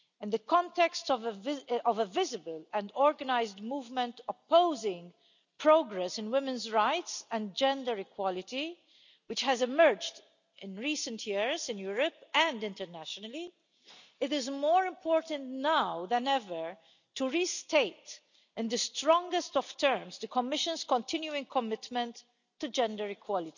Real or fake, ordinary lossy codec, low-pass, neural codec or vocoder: real; none; 7.2 kHz; none